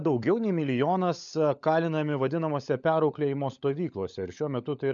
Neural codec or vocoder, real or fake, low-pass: codec, 16 kHz, 16 kbps, FunCodec, trained on LibriTTS, 50 frames a second; fake; 7.2 kHz